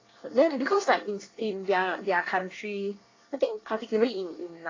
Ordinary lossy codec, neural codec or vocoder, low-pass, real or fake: AAC, 32 kbps; codec, 24 kHz, 1 kbps, SNAC; 7.2 kHz; fake